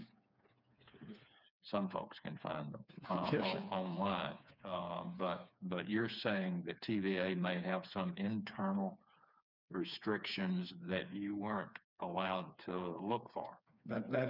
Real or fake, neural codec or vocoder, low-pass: fake; codec, 16 kHz, 4 kbps, FreqCodec, smaller model; 5.4 kHz